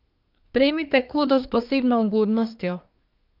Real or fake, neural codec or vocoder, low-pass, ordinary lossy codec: fake; codec, 24 kHz, 1 kbps, SNAC; 5.4 kHz; none